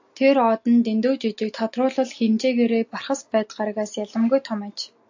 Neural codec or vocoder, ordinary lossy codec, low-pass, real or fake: none; AAC, 48 kbps; 7.2 kHz; real